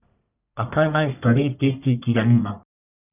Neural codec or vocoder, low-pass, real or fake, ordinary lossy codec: codec, 44.1 kHz, 1.7 kbps, Pupu-Codec; 3.6 kHz; fake; none